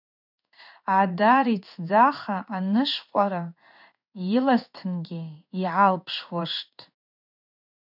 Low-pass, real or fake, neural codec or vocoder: 5.4 kHz; fake; codec, 16 kHz in and 24 kHz out, 1 kbps, XY-Tokenizer